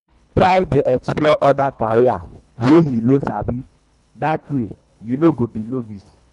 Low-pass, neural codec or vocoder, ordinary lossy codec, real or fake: 10.8 kHz; codec, 24 kHz, 1.5 kbps, HILCodec; none; fake